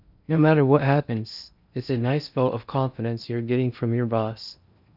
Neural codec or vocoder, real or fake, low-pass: codec, 16 kHz in and 24 kHz out, 0.6 kbps, FocalCodec, streaming, 4096 codes; fake; 5.4 kHz